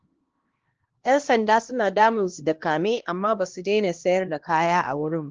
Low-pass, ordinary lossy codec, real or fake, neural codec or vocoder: 7.2 kHz; Opus, 16 kbps; fake; codec, 16 kHz, 1 kbps, X-Codec, HuBERT features, trained on LibriSpeech